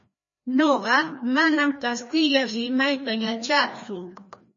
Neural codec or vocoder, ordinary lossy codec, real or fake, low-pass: codec, 16 kHz, 1 kbps, FreqCodec, larger model; MP3, 32 kbps; fake; 7.2 kHz